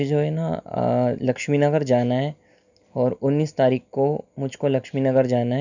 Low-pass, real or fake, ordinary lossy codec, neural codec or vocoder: 7.2 kHz; real; none; none